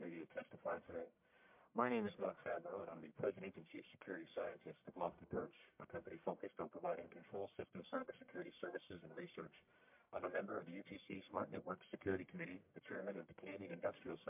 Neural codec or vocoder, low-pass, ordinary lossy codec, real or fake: codec, 44.1 kHz, 1.7 kbps, Pupu-Codec; 3.6 kHz; MP3, 32 kbps; fake